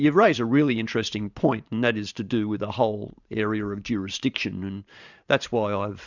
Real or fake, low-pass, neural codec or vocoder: fake; 7.2 kHz; vocoder, 44.1 kHz, 128 mel bands every 512 samples, BigVGAN v2